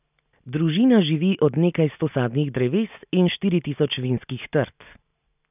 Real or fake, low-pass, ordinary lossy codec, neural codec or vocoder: real; 3.6 kHz; none; none